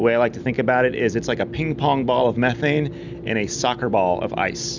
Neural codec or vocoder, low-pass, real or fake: none; 7.2 kHz; real